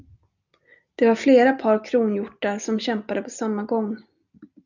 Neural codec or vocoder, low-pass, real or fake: none; 7.2 kHz; real